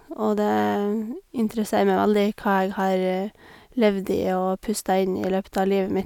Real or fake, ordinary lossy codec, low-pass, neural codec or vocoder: real; none; 19.8 kHz; none